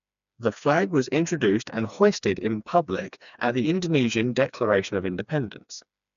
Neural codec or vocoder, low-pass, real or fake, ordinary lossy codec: codec, 16 kHz, 2 kbps, FreqCodec, smaller model; 7.2 kHz; fake; none